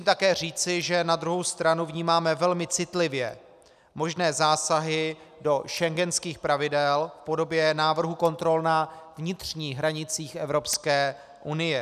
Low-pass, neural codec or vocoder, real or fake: 14.4 kHz; none; real